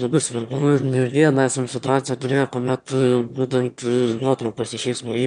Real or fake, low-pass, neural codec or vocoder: fake; 9.9 kHz; autoencoder, 22.05 kHz, a latent of 192 numbers a frame, VITS, trained on one speaker